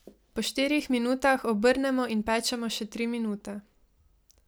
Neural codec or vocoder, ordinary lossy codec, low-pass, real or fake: none; none; none; real